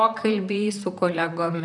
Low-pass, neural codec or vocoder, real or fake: 10.8 kHz; vocoder, 44.1 kHz, 128 mel bands, Pupu-Vocoder; fake